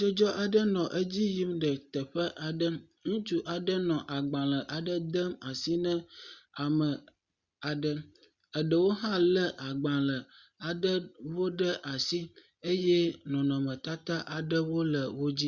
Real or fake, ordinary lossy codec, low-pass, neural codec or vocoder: real; Opus, 64 kbps; 7.2 kHz; none